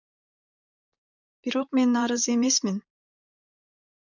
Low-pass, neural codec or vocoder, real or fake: 7.2 kHz; vocoder, 44.1 kHz, 128 mel bands, Pupu-Vocoder; fake